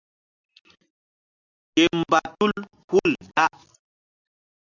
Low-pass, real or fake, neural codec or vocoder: 7.2 kHz; real; none